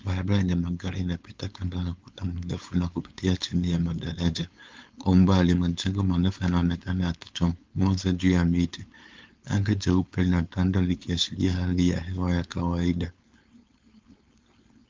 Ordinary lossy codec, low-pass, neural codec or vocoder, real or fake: Opus, 32 kbps; 7.2 kHz; codec, 16 kHz, 4.8 kbps, FACodec; fake